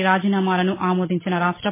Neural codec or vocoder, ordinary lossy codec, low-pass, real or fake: none; MP3, 16 kbps; 3.6 kHz; real